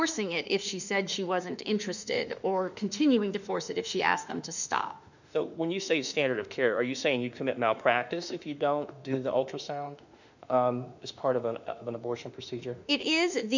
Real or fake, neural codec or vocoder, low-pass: fake; autoencoder, 48 kHz, 32 numbers a frame, DAC-VAE, trained on Japanese speech; 7.2 kHz